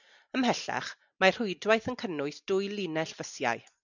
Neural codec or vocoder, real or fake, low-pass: none; real; 7.2 kHz